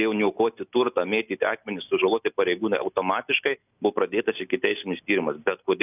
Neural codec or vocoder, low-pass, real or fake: none; 3.6 kHz; real